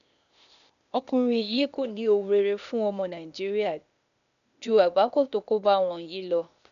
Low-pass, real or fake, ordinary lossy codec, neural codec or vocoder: 7.2 kHz; fake; none; codec, 16 kHz, 0.8 kbps, ZipCodec